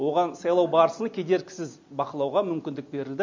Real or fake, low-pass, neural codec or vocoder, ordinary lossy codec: real; 7.2 kHz; none; MP3, 64 kbps